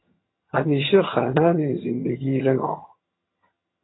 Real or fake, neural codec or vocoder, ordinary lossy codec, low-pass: fake; vocoder, 22.05 kHz, 80 mel bands, HiFi-GAN; AAC, 16 kbps; 7.2 kHz